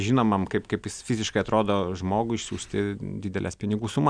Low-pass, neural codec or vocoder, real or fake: 9.9 kHz; none; real